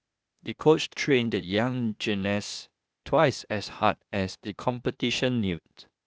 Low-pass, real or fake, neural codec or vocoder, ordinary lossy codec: none; fake; codec, 16 kHz, 0.8 kbps, ZipCodec; none